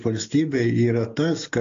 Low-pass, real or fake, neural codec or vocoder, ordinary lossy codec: 7.2 kHz; real; none; AAC, 48 kbps